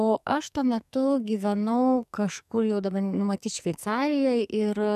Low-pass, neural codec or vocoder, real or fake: 14.4 kHz; codec, 44.1 kHz, 2.6 kbps, SNAC; fake